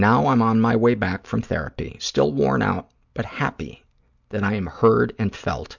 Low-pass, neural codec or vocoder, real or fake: 7.2 kHz; none; real